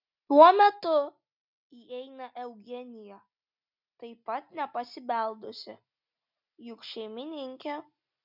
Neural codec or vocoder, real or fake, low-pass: none; real; 5.4 kHz